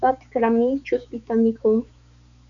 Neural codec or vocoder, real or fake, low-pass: codec, 16 kHz, 4 kbps, X-Codec, HuBERT features, trained on balanced general audio; fake; 7.2 kHz